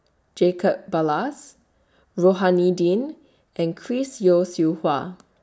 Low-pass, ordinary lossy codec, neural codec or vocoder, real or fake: none; none; none; real